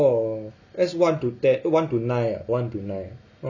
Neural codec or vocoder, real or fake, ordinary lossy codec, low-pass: none; real; none; 7.2 kHz